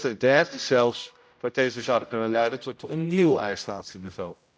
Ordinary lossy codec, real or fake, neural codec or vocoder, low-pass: none; fake; codec, 16 kHz, 0.5 kbps, X-Codec, HuBERT features, trained on general audio; none